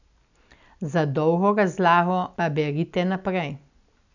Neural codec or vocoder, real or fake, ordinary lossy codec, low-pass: none; real; none; 7.2 kHz